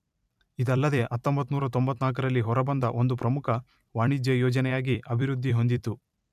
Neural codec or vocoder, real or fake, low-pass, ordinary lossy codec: vocoder, 44.1 kHz, 128 mel bands every 256 samples, BigVGAN v2; fake; 14.4 kHz; none